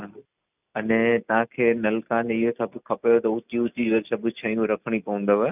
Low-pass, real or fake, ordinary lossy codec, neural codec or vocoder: 3.6 kHz; real; none; none